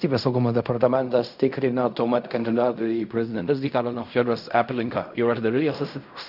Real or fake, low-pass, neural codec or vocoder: fake; 5.4 kHz; codec, 16 kHz in and 24 kHz out, 0.4 kbps, LongCat-Audio-Codec, fine tuned four codebook decoder